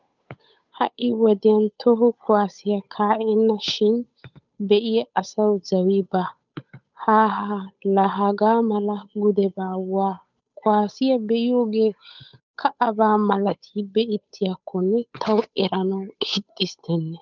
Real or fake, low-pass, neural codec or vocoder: fake; 7.2 kHz; codec, 16 kHz, 8 kbps, FunCodec, trained on Chinese and English, 25 frames a second